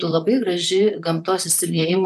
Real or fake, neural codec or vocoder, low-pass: fake; vocoder, 44.1 kHz, 128 mel bands, Pupu-Vocoder; 14.4 kHz